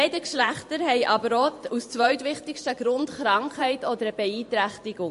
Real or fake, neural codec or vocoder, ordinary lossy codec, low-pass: fake; vocoder, 44.1 kHz, 128 mel bands every 512 samples, BigVGAN v2; MP3, 48 kbps; 14.4 kHz